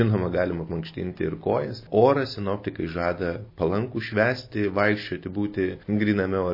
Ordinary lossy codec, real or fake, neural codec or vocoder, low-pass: MP3, 24 kbps; real; none; 5.4 kHz